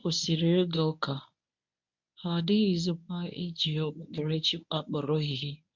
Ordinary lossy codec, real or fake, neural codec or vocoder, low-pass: MP3, 64 kbps; fake; codec, 24 kHz, 0.9 kbps, WavTokenizer, medium speech release version 1; 7.2 kHz